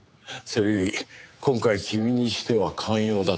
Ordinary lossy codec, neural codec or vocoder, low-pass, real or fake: none; codec, 16 kHz, 4 kbps, X-Codec, HuBERT features, trained on general audio; none; fake